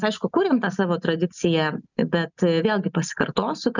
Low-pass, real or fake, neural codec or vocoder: 7.2 kHz; real; none